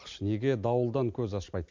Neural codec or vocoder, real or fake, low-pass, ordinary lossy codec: none; real; 7.2 kHz; MP3, 64 kbps